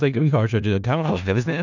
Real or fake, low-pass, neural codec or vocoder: fake; 7.2 kHz; codec, 16 kHz in and 24 kHz out, 0.4 kbps, LongCat-Audio-Codec, four codebook decoder